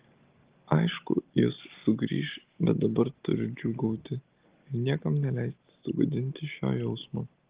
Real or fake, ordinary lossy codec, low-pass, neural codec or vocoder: real; Opus, 16 kbps; 3.6 kHz; none